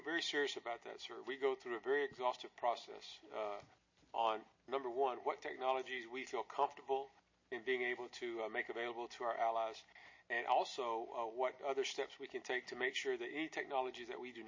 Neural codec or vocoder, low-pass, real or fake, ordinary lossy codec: none; 7.2 kHz; real; MP3, 32 kbps